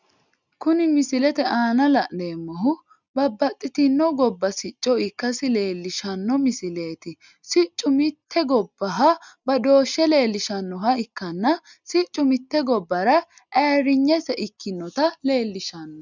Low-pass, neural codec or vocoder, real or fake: 7.2 kHz; none; real